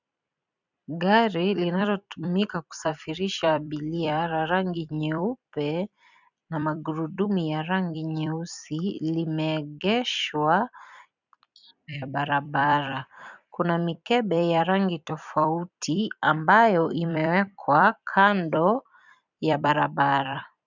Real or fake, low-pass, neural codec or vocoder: fake; 7.2 kHz; vocoder, 44.1 kHz, 128 mel bands every 256 samples, BigVGAN v2